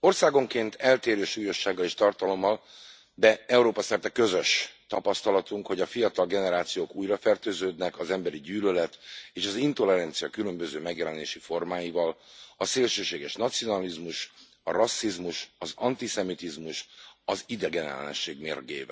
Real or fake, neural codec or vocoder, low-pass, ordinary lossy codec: real; none; none; none